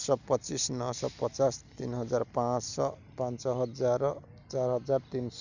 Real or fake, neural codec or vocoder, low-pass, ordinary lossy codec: real; none; 7.2 kHz; none